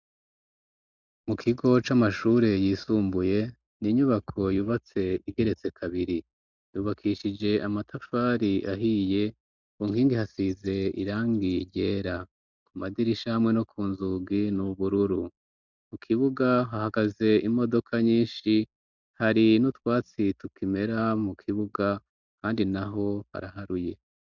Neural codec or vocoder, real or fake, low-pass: none; real; 7.2 kHz